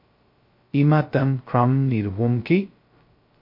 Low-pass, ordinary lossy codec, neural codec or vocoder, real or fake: 5.4 kHz; MP3, 32 kbps; codec, 16 kHz, 0.2 kbps, FocalCodec; fake